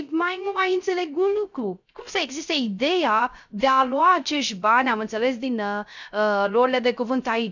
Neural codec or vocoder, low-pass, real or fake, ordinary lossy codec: codec, 16 kHz, 0.3 kbps, FocalCodec; 7.2 kHz; fake; none